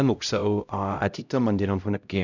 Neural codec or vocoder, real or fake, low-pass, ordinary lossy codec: codec, 16 kHz, 0.5 kbps, X-Codec, HuBERT features, trained on LibriSpeech; fake; 7.2 kHz; none